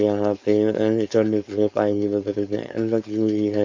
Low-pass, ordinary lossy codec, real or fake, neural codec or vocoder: 7.2 kHz; MP3, 48 kbps; fake; codec, 16 kHz, 4.8 kbps, FACodec